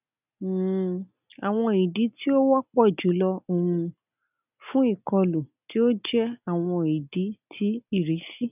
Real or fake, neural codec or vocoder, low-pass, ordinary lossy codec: real; none; 3.6 kHz; none